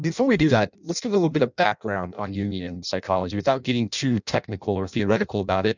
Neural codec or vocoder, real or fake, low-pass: codec, 16 kHz in and 24 kHz out, 0.6 kbps, FireRedTTS-2 codec; fake; 7.2 kHz